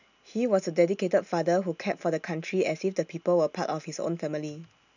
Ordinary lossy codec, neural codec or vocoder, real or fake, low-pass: none; none; real; 7.2 kHz